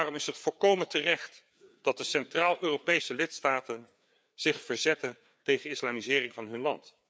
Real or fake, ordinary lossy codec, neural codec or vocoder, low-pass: fake; none; codec, 16 kHz, 4 kbps, FreqCodec, larger model; none